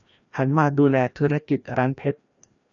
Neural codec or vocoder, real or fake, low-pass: codec, 16 kHz, 1 kbps, FreqCodec, larger model; fake; 7.2 kHz